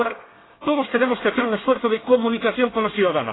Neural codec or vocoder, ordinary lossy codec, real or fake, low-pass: codec, 24 kHz, 1 kbps, SNAC; AAC, 16 kbps; fake; 7.2 kHz